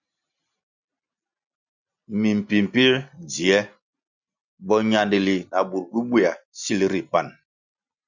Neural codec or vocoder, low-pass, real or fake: none; 7.2 kHz; real